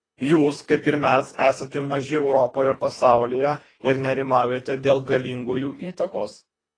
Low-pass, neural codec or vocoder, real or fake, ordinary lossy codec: 9.9 kHz; codec, 24 kHz, 1.5 kbps, HILCodec; fake; AAC, 32 kbps